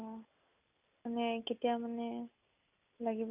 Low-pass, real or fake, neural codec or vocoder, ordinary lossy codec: 3.6 kHz; real; none; none